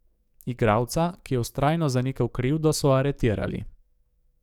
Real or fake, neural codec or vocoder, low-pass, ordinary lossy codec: fake; codec, 44.1 kHz, 7.8 kbps, DAC; 19.8 kHz; none